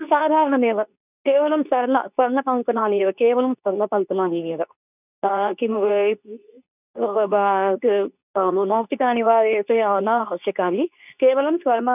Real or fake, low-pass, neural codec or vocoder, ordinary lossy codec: fake; 3.6 kHz; codec, 24 kHz, 0.9 kbps, WavTokenizer, medium speech release version 2; none